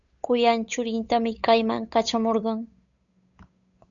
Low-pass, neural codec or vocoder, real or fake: 7.2 kHz; codec, 16 kHz, 8 kbps, FunCodec, trained on Chinese and English, 25 frames a second; fake